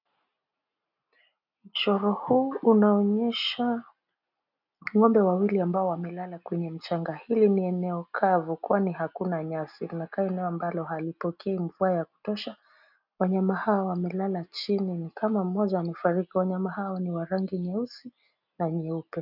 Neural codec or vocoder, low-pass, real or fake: none; 5.4 kHz; real